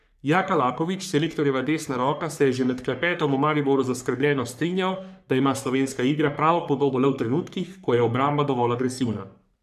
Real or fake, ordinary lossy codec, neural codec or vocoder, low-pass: fake; none; codec, 44.1 kHz, 3.4 kbps, Pupu-Codec; 14.4 kHz